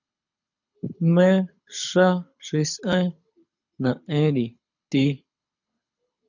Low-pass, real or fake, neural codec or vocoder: 7.2 kHz; fake; codec, 24 kHz, 6 kbps, HILCodec